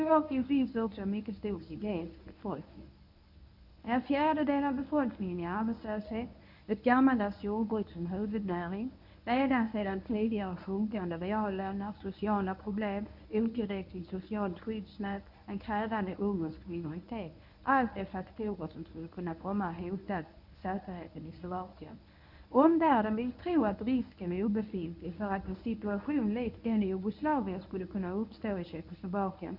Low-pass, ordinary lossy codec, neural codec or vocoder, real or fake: 5.4 kHz; none; codec, 24 kHz, 0.9 kbps, WavTokenizer, medium speech release version 1; fake